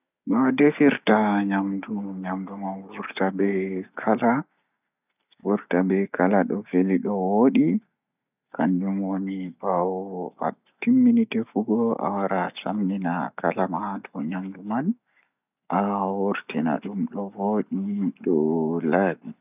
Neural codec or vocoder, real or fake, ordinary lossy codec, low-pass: none; real; none; 3.6 kHz